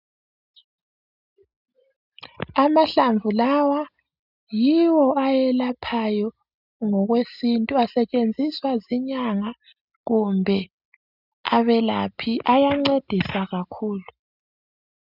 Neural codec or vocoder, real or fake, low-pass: none; real; 5.4 kHz